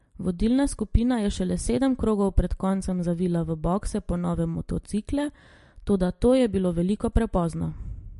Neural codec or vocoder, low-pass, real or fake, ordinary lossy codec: none; 14.4 kHz; real; MP3, 48 kbps